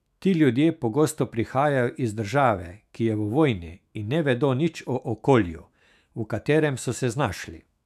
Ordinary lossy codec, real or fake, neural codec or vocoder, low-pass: none; fake; autoencoder, 48 kHz, 128 numbers a frame, DAC-VAE, trained on Japanese speech; 14.4 kHz